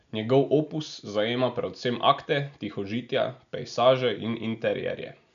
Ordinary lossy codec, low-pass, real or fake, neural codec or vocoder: none; 7.2 kHz; real; none